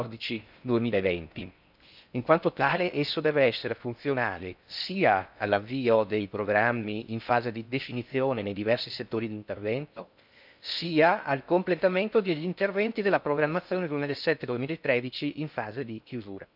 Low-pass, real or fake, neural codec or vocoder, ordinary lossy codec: 5.4 kHz; fake; codec, 16 kHz in and 24 kHz out, 0.6 kbps, FocalCodec, streaming, 4096 codes; none